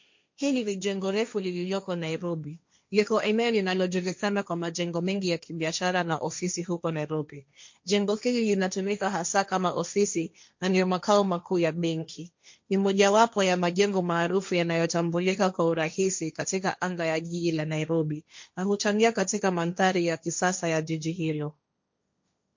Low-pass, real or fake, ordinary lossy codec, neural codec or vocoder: 7.2 kHz; fake; MP3, 48 kbps; codec, 16 kHz, 1.1 kbps, Voila-Tokenizer